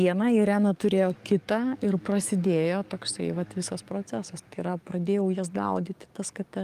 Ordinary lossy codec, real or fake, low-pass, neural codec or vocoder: Opus, 32 kbps; fake; 14.4 kHz; codec, 44.1 kHz, 7.8 kbps, DAC